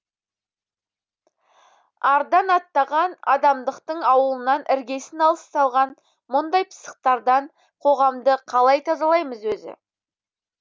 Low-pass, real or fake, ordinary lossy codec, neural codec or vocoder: none; real; none; none